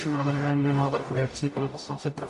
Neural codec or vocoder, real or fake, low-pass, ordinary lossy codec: codec, 44.1 kHz, 0.9 kbps, DAC; fake; 14.4 kHz; MP3, 48 kbps